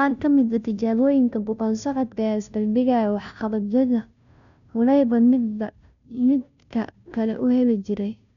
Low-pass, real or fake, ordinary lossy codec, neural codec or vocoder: 7.2 kHz; fake; none; codec, 16 kHz, 0.5 kbps, FunCodec, trained on Chinese and English, 25 frames a second